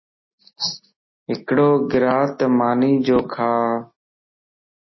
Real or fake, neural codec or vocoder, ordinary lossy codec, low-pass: real; none; MP3, 24 kbps; 7.2 kHz